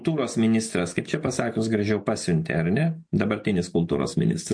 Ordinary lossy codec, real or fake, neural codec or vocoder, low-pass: MP3, 48 kbps; real; none; 9.9 kHz